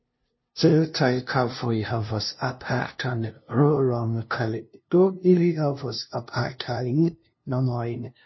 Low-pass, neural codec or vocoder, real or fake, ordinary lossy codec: 7.2 kHz; codec, 16 kHz, 0.5 kbps, FunCodec, trained on LibriTTS, 25 frames a second; fake; MP3, 24 kbps